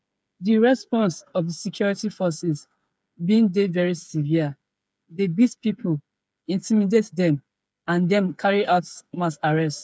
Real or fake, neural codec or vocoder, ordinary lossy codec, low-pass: fake; codec, 16 kHz, 8 kbps, FreqCodec, smaller model; none; none